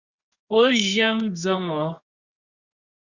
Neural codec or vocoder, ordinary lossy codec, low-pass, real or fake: codec, 24 kHz, 0.9 kbps, WavTokenizer, medium music audio release; Opus, 64 kbps; 7.2 kHz; fake